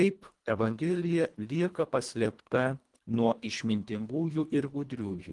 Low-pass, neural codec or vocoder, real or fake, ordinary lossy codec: 10.8 kHz; codec, 24 kHz, 1.5 kbps, HILCodec; fake; Opus, 24 kbps